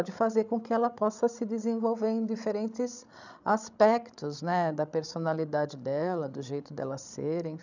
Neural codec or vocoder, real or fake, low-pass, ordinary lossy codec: codec, 16 kHz, 16 kbps, FreqCodec, larger model; fake; 7.2 kHz; none